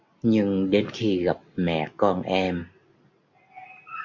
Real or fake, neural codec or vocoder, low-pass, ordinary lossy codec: real; none; 7.2 kHz; AAC, 48 kbps